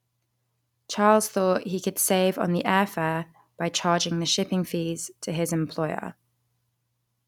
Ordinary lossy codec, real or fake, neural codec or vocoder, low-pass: none; real; none; 19.8 kHz